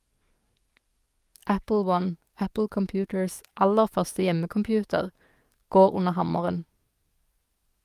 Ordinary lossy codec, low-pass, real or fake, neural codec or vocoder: Opus, 32 kbps; 14.4 kHz; fake; codec, 44.1 kHz, 7.8 kbps, DAC